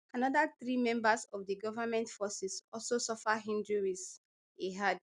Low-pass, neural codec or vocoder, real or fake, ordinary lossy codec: 10.8 kHz; none; real; none